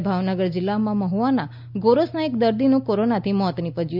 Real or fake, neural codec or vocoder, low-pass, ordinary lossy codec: real; none; 5.4 kHz; none